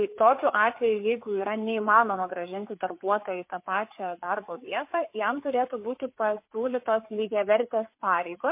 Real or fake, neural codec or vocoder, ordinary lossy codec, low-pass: fake; codec, 16 kHz, 4 kbps, FreqCodec, larger model; MP3, 24 kbps; 3.6 kHz